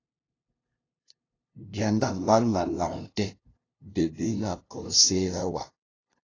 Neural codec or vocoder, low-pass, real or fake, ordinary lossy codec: codec, 16 kHz, 0.5 kbps, FunCodec, trained on LibriTTS, 25 frames a second; 7.2 kHz; fake; AAC, 32 kbps